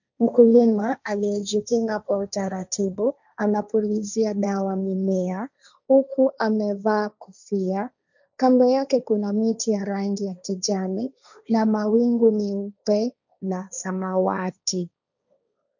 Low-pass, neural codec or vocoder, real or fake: 7.2 kHz; codec, 16 kHz, 1.1 kbps, Voila-Tokenizer; fake